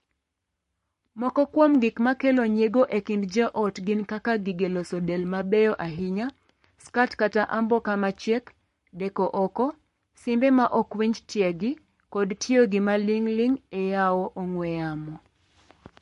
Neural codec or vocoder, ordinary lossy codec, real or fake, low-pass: codec, 44.1 kHz, 7.8 kbps, Pupu-Codec; MP3, 48 kbps; fake; 14.4 kHz